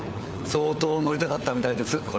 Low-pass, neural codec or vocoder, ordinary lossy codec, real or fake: none; codec, 16 kHz, 4 kbps, FunCodec, trained on LibriTTS, 50 frames a second; none; fake